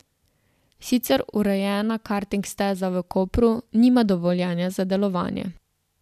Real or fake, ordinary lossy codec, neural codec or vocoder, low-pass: real; none; none; 14.4 kHz